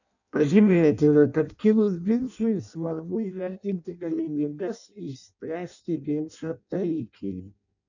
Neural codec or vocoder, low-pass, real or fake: codec, 16 kHz in and 24 kHz out, 0.6 kbps, FireRedTTS-2 codec; 7.2 kHz; fake